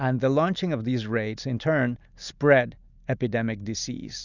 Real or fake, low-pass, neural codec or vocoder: real; 7.2 kHz; none